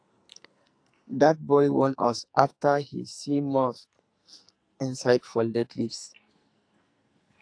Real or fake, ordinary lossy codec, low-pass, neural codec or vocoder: fake; AAC, 48 kbps; 9.9 kHz; codec, 44.1 kHz, 2.6 kbps, SNAC